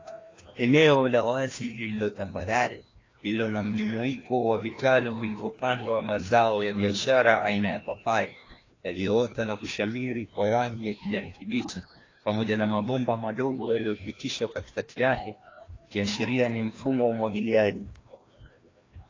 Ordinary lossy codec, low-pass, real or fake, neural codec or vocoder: AAC, 32 kbps; 7.2 kHz; fake; codec, 16 kHz, 1 kbps, FreqCodec, larger model